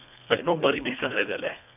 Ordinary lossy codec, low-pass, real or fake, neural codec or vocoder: none; 3.6 kHz; fake; codec, 24 kHz, 1.5 kbps, HILCodec